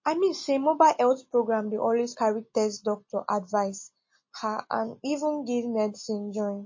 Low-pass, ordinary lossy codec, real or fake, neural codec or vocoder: 7.2 kHz; MP3, 32 kbps; real; none